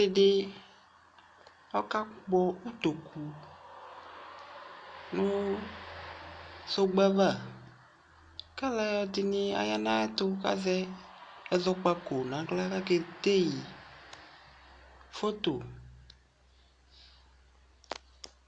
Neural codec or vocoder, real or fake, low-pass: none; real; 9.9 kHz